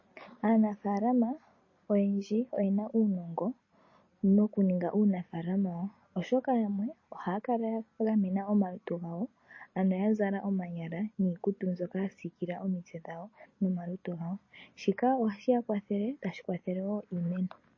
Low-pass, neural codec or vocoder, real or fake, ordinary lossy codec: 7.2 kHz; none; real; MP3, 32 kbps